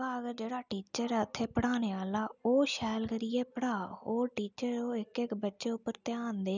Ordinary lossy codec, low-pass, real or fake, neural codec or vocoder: none; 7.2 kHz; real; none